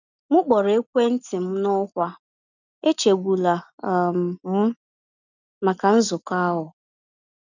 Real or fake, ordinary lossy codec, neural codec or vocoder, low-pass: real; none; none; 7.2 kHz